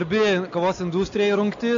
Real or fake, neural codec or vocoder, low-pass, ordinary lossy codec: real; none; 7.2 kHz; AAC, 64 kbps